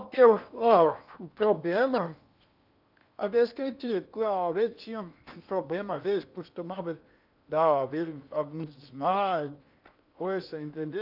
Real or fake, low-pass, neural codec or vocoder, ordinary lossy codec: fake; 5.4 kHz; codec, 16 kHz in and 24 kHz out, 0.8 kbps, FocalCodec, streaming, 65536 codes; none